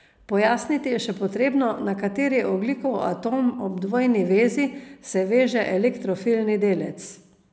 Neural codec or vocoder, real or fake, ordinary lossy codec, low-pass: none; real; none; none